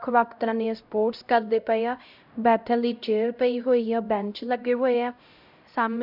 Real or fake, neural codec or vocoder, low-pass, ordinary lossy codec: fake; codec, 16 kHz, 0.5 kbps, X-Codec, HuBERT features, trained on LibriSpeech; 5.4 kHz; none